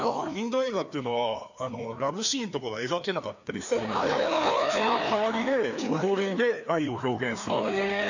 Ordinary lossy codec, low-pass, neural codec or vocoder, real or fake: none; 7.2 kHz; codec, 16 kHz, 2 kbps, FreqCodec, larger model; fake